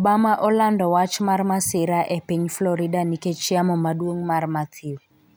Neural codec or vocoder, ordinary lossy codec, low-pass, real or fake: none; none; none; real